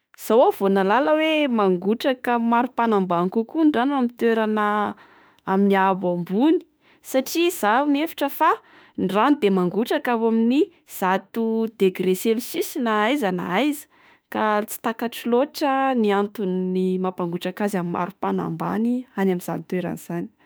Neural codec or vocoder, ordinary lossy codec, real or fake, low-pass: autoencoder, 48 kHz, 32 numbers a frame, DAC-VAE, trained on Japanese speech; none; fake; none